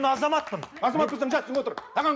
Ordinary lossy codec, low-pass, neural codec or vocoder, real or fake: none; none; none; real